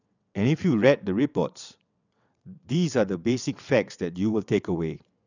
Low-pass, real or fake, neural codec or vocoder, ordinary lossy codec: 7.2 kHz; fake; vocoder, 22.05 kHz, 80 mel bands, WaveNeXt; none